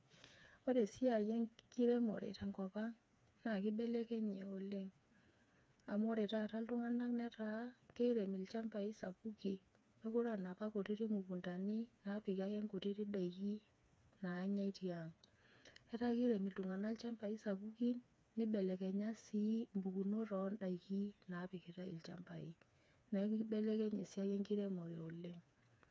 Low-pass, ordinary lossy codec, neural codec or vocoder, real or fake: none; none; codec, 16 kHz, 4 kbps, FreqCodec, smaller model; fake